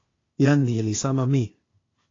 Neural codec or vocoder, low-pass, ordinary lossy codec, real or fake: codec, 16 kHz, 0.8 kbps, ZipCodec; 7.2 kHz; AAC, 32 kbps; fake